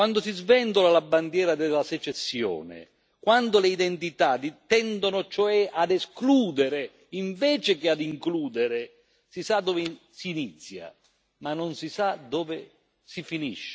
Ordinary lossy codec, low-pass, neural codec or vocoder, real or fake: none; none; none; real